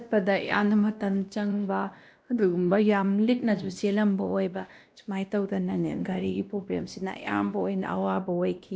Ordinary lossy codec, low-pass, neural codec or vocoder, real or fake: none; none; codec, 16 kHz, 1 kbps, X-Codec, WavLM features, trained on Multilingual LibriSpeech; fake